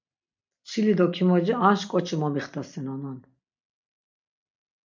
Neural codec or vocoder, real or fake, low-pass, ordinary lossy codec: none; real; 7.2 kHz; MP3, 64 kbps